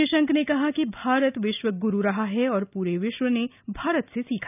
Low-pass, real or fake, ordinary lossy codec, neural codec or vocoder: 3.6 kHz; real; none; none